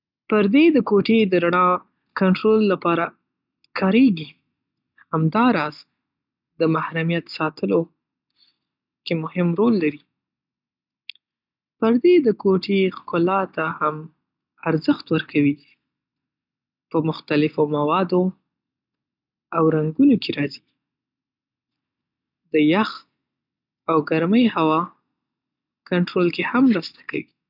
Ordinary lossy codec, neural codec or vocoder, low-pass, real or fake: none; none; 5.4 kHz; real